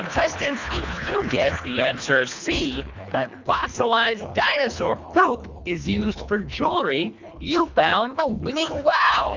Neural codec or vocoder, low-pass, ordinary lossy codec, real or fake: codec, 24 kHz, 1.5 kbps, HILCodec; 7.2 kHz; MP3, 64 kbps; fake